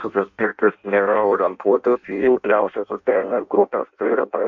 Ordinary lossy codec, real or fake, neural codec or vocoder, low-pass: MP3, 48 kbps; fake; codec, 16 kHz in and 24 kHz out, 0.6 kbps, FireRedTTS-2 codec; 7.2 kHz